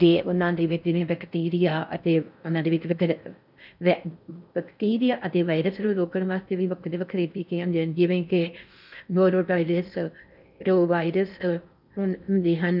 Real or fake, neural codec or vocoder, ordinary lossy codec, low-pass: fake; codec, 16 kHz in and 24 kHz out, 0.6 kbps, FocalCodec, streaming, 4096 codes; none; 5.4 kHz